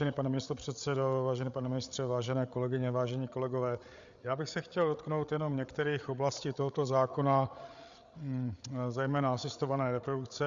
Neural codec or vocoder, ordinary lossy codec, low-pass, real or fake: codec, 16 kHz, 8 kbps, FreqCodec, larger model; Opus, 64 kbps; 7.2 kHz; fake